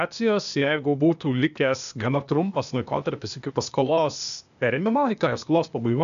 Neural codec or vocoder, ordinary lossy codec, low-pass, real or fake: codec, 16 kHz, 0.8 kbps, ZipCodec; MP3, 96 kbps; 7.2 kHz; fake